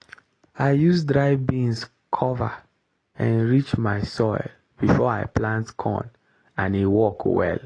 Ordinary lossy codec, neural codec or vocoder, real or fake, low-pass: AAC, 32 kbps; none; real; 9.9 kHz